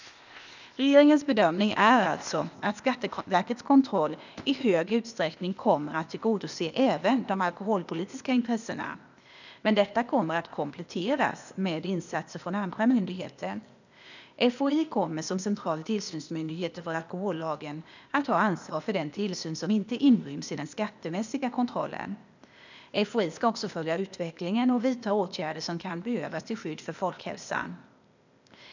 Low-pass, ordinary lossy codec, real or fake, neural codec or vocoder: 7.2 kHz; none; fake; codec, 16 kHz, 0.8 kbps, ZipCodec